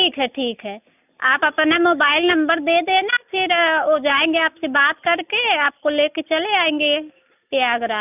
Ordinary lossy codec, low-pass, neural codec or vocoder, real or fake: none; 3.6 kHz; none; real